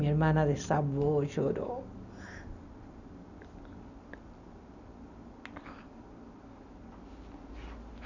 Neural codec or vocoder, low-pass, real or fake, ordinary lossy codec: none; 7.2 kHz; real; none